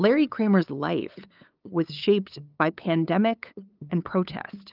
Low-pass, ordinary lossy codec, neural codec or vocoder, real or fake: 5.4 kHz; Opus, 24 kbps; codec, 16 kHz, 8 kbps, FunCodec, trained on LibriTTS, 25 frames a second; fake